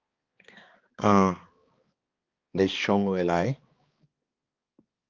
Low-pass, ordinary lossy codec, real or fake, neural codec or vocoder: 7.2 kHz; Opus, 24 kbps; fake; codec, 16 kHz, 2 kbps, X-Codec, HuBERT features, trained on balanced general audio